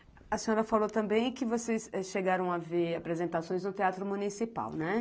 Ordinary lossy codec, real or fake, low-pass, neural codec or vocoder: none; real; none; none